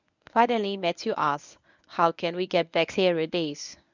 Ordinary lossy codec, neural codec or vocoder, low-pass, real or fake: none; codec, 24 kHz, 0.9 kbps, WavTokenizer, medium speech release version 1; 7.2 kHz; fake